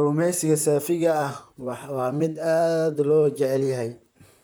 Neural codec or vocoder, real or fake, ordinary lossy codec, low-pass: vocoder, 44.1 kHz, 128 mel bands, Pupu-Vocoder; fake; none; none